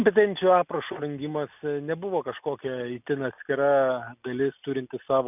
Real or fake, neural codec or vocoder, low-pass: real; none; 3.6 kHz